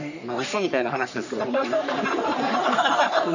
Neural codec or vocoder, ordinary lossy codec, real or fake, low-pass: codec, 44.1 kHz, 3.4 kbps, Pupu-Codec; none; fake; 7.2 kHz